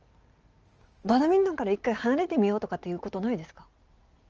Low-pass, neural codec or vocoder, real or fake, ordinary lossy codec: 7.2 kHz; none; real; Opus, 24 kbps